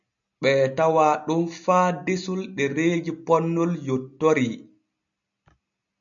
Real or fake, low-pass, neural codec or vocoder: real; 7.2 kHz; none